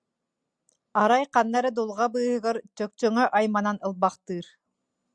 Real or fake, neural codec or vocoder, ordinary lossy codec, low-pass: real; none; Opus, 64 kbps; 9.9 kHz